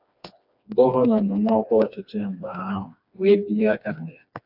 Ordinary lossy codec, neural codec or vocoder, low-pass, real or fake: AAC, 48 kbps; codec, 16 kHz, 2 kbps, FreqCodec, smaller model; 5.4 kHz; fake